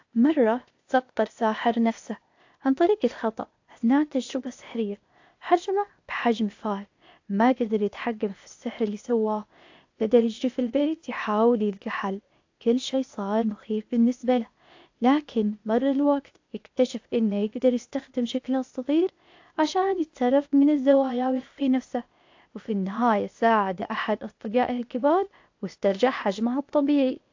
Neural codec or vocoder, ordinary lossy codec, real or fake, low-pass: codec, 16 kHz, 0.8 kbps, ZipCodec; AAC, 48 kbps; fake; 7.2 kHz